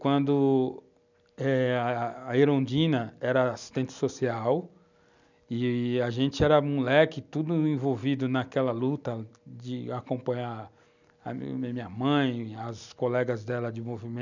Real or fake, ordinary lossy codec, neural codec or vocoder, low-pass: real; none; none; 7.2 kHz